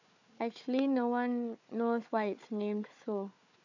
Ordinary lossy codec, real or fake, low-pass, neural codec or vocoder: none; fake; 7.2 kHz; codec, 16 kHz, 4 kbps, FunCodec, trained on Chinese and English, 50 frames a second